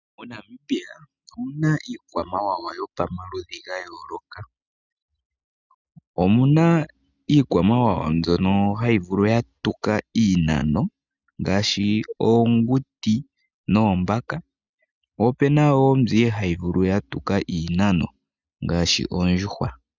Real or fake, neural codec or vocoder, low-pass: real; none; 7.2 kHz